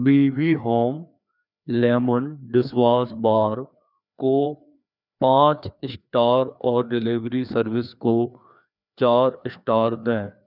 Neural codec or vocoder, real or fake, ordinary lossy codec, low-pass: codec, 16 kHz, 2 kbps, FreqCodec, larger model; fake; none; 5.4 kHz